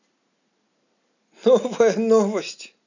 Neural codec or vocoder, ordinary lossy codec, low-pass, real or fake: none; none; 7.2 kHz; real